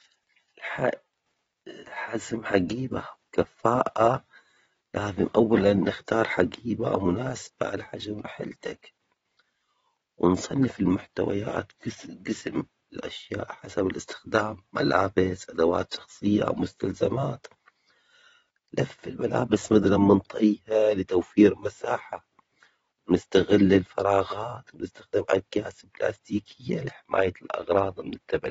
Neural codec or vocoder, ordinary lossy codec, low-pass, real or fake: vocoder, 24 kHz, 100 mel bands, Vocos; AAC, 24 kbps; 10.8 kHz; fake